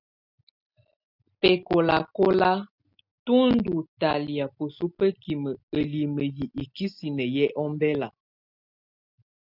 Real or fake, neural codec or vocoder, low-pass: real; none; 5.4 kHz